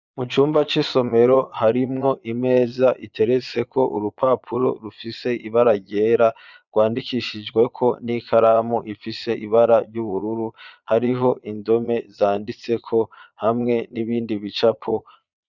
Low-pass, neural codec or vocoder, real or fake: 7.2 kHz; vocoder, 22.05 kHz, 80 mel bands, Vocos; fake